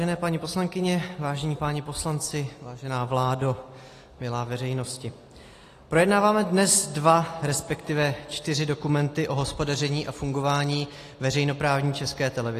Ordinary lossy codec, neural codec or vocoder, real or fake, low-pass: AAC, 48 kbps; none; real; 14.4 kHz